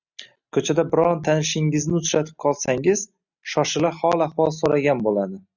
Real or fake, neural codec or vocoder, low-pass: real; none; 7.2 kHz